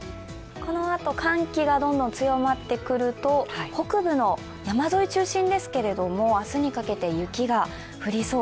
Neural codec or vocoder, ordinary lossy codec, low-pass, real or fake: none; none; none; real